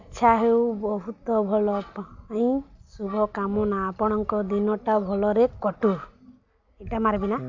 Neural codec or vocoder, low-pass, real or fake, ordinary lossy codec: none; 7.2 kHz; real; AAC, 48 kbps